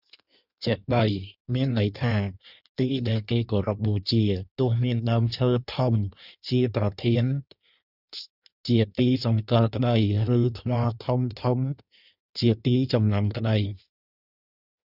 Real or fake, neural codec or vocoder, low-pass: fake; codec, 16 kHz in and 24 kHz out, 1.1 kbps, FireRedTTS-2 codec; 5.4 kHz